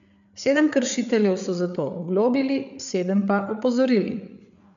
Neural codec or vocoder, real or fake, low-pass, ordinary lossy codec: codec, 16 kHz, 8 kbps, FreqCodec, larger model; fake; 7.2 kHz; none